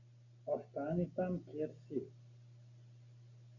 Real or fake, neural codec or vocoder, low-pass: real; none; 7.2 kHz